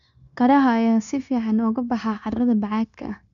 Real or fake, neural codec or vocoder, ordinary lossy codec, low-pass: fake; codec, 16 kHz, 0.9 kbps, LongCat-Audio-Codec; none; 7.2 kHz